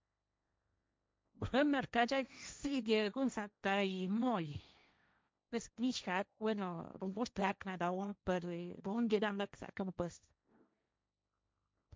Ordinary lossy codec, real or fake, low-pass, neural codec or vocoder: none; fake; none; codec, 16 kHz, 1.1 kbps, Voila-Tokenizer